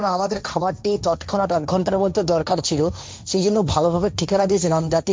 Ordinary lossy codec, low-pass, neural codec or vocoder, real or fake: none; none; codec, 16 kHz, 1.1 kbps, Voila-Tokenizer; fake